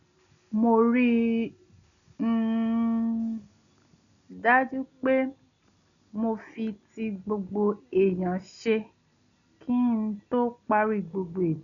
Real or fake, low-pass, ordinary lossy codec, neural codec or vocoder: real; 7.2 kHz; none; none